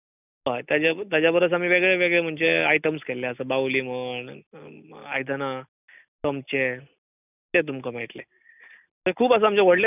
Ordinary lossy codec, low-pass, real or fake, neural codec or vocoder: none; 3.6 kHz; real; none